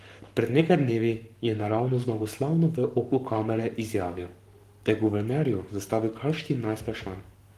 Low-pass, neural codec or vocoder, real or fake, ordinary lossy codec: 14.4 kHz; codec, 44.1 kHz, 7.8 kbps, Pupu-Codec; fake; Opus, 24 kbps